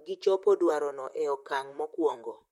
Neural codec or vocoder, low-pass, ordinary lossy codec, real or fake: vocoder, 44.1 kHz, 128 mel bands, Pupu-Vocoder; 19.8 kHz; MP3, 64 kbps; fake